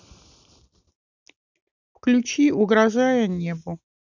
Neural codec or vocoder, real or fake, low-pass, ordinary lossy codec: none; real; 7.2 kHz; none